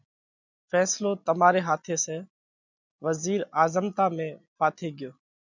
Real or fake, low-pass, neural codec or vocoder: real; 7.2 kHz; none